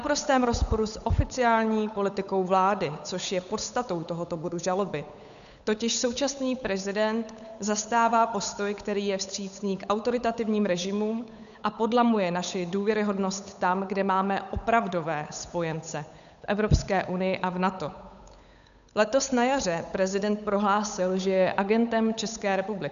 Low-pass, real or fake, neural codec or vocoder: 7.2 kHz; fake; codec, 16 kHz, 8 kbps, FunCodec, trained on Chinese and English, 25 frames a second